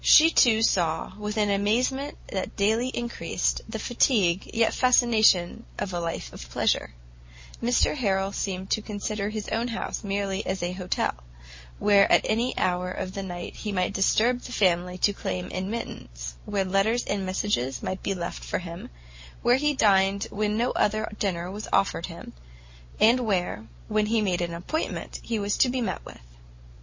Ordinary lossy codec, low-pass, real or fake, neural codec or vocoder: MP3, 32 kbps; 7.2 kHz; real; none